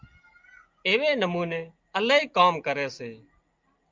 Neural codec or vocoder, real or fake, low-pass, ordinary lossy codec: none; real; 7.2 kHz; Opus, 24 kbps